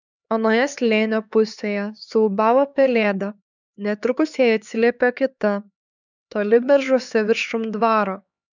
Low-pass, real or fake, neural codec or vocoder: 7.2 kHz; fake; codec, 16 kHz, 4 kbps, X-Codec, HuBERT features, trained on LibriSpeech